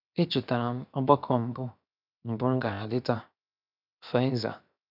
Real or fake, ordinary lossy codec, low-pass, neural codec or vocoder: fake; none; 5.4 kHz; codec, 16 kHz, 0.7 kbps, FocalCodec